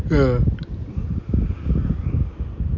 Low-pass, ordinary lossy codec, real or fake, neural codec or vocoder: 7.2 kHz; none; real; none